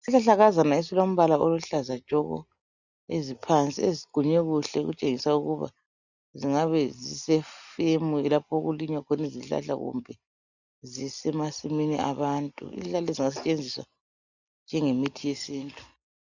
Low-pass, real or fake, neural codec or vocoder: 7.2 kHz; real; none